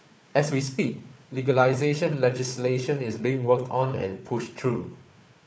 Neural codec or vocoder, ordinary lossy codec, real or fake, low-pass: codec, 16 kHz, 4 kbps, FunCodec, trained on Chinese and English, 50 frames a second; none; fake; none